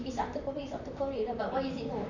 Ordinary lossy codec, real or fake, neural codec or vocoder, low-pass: none; fake; vocoder, 44.1 kHz, 128 mel bands, Pupu-Vocoder; 7.2 kHz